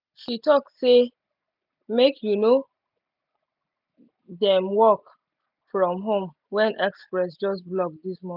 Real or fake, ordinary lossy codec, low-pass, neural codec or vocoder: real; none; 5.4 kHz; none